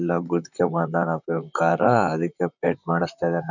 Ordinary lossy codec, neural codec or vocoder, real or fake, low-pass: none; vocoder, 44.1 kHz, 128 mel bands, Pupu-Vocoder; fake; 7.2 kHz